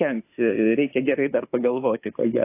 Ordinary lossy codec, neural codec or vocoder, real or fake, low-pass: AAC, 24 kbps; autoencoder, 48 kHz, 32 numbers a frame, DAC-VAE, trained on Japanese speech; fake; 3.6 kHz